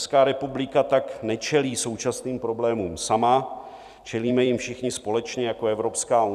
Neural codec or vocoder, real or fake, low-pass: vocoder, 44.1 kHz, 128 mel bands every 256 samples, BigVGAN v2; fake; 14.4 kHz